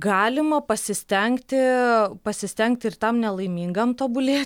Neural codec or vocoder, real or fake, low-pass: none; real; 19.8 kHz